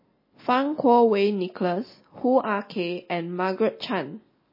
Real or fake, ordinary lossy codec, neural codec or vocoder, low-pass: real; MP3, 24 kbps; none; 5.4 kHz